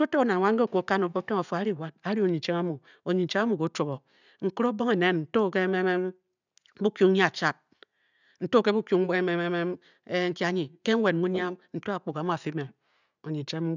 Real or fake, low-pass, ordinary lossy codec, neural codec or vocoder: real; 7.2 kHz; none; none